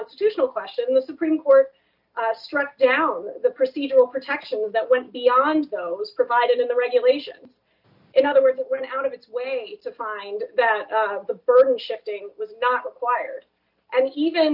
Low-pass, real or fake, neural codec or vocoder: 5.4 kHz; real; none